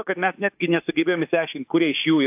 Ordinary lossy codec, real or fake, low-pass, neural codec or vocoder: AAC, 32 kbps; fake; 3.6 kHz; autoencoder, 48 kHz, 32 numbers a frame, DAC-VAE, trained on Japanese speech